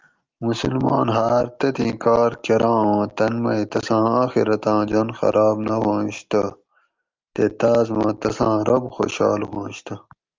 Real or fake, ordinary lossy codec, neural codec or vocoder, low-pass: real; Opus, 24 kbps; none; 7.2 kHz